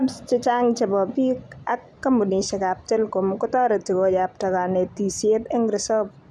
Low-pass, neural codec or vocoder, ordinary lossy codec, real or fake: none; none; none; real